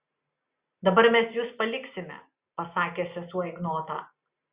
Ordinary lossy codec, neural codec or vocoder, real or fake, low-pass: Opus, 64 kbps; none; real; 3.6 kHz